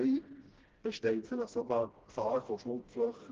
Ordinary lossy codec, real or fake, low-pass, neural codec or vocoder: Opus, 16 kbps; fake; 7.2 kHz; codec, 16 kHz, 1 kbps, FreqCodec, smaller model